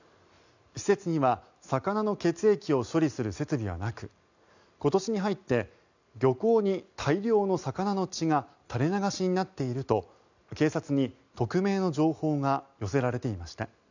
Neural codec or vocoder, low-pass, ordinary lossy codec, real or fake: none; 7.2 kHz; none; real